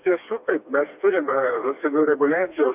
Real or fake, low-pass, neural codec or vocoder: fake; 3.6 kHz; codec, 16 kHz, 2 kbps, FreqCodec, smaller model